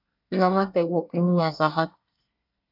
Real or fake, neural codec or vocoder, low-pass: fake; codec, 24 kHz, 1 kbps, SNAC; 5.4 kHz